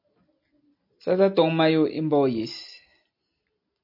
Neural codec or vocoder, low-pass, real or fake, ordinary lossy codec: none; 5.4 kHz; real; MP3, 32 kbps